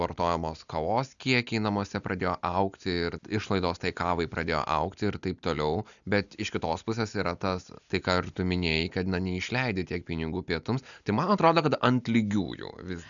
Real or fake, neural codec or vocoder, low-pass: real; none; 7.2 kHz